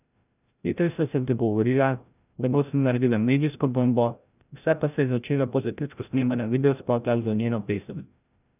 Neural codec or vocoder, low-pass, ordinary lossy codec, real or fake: codec, 16 kHz, 0.5 kbps, FreqCodec, larger model; 3.6 kHz; none; fake